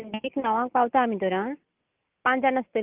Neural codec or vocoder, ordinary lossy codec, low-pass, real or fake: none; Opus, 24 kbps; 3.6 kHz; real